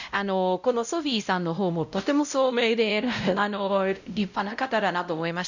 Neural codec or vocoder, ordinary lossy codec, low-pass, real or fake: codec, 16 kHz, 0.5 kbps, X-Codec, WavLM features, trained on Multilingual LibriSpeech; none; 7.2 kHz; fake